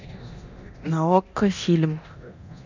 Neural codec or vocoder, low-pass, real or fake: codec, 24 kHz, 0.9 kbps, DualCodec; 7.2 kHz; fake